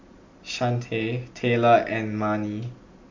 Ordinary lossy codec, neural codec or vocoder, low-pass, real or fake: MP3, 48 kbps; none; 7.2 kHz; real